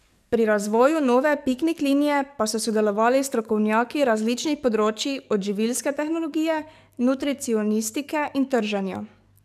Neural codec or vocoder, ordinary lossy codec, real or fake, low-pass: codec, 44.1 kHz, 7.8 kbps, DAC; none; fake; 14.4 kHz